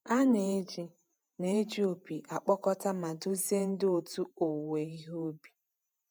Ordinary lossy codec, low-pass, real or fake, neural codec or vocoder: none; none; fake; vocoder, 48 kHz, 128 mel bands, Vocos